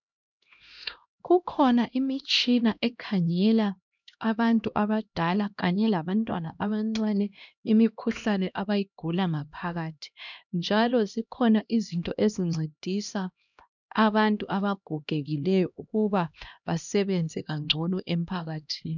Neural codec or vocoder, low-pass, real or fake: codec, 16 kHz, 1 kbps, X-Codec, HuBERT features, trained on LibriSpeech; 7.2 kHz; fake